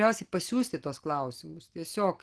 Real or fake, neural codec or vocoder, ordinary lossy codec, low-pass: real; none; Opus, 16 kbps; 10.8 kHz